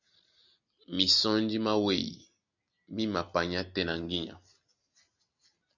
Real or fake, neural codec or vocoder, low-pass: real; none; 7.2 kHz